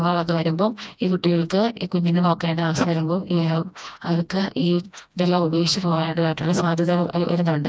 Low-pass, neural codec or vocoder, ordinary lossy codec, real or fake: none; codec, 16 kHz, 1 kbps, FreqCodec, smaller model; none; fake